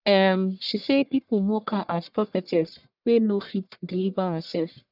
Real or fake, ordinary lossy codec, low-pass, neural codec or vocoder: fake; none; 5.4 kHz; codec, 44.1 kHz, 1.7 kbps, Pupu-Codec